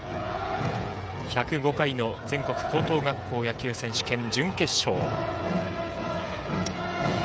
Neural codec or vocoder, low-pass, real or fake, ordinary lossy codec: codec, 16 kHz, 16 kbps, FreqCodec, smaller model; none; fake; none